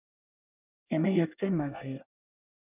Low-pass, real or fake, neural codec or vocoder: 3.6 kHz; fake; codec, 24 kHz, 1 kbps, SNAC